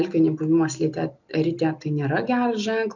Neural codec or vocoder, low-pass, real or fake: none; 7.2 kHz; real